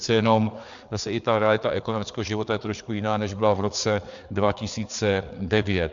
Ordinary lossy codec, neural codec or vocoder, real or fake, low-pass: MP3, 64 kbps; codec, 16 kHz, 4 kbps, FreqCodec, larger model; fake; 7.2 kHz